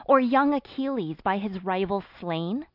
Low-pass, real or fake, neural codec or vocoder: 5.4 kHz; real; none